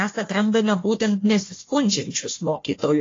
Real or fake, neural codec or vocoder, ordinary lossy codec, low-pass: fake; codec, 16 kHz, 1 kbps, FunCodec, trained on Chinese and English, 50 frames a second; AAC, 32 kbps; 7.2 kHz